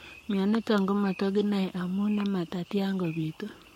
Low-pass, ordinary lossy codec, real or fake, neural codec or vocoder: 19.8 kHz; MP3, 64 kbps; fake; codec, 44.1 kHz, 7.8 kbps, Pupu-Codec